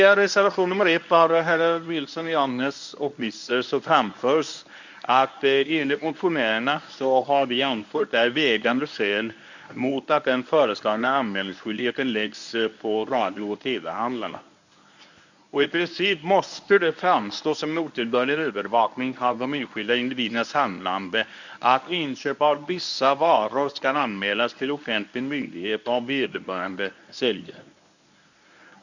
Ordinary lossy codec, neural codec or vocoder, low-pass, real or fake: none; codec, 24 kHz, 0.9 kbps, WavTokenizer, medium speech release version 1; 7.2 kHz; fake